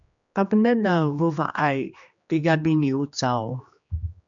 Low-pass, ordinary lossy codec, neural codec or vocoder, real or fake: 7.2 kHz; MP3, 96 kbps; codec, 16 kHz, 2 kbps, X-Codec, HuBERT features, trained on general audio; fake